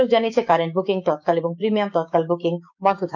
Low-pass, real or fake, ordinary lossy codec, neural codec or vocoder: 7.2 kHz; fake; none; codec, 16 kHz, 6 kbps, DAC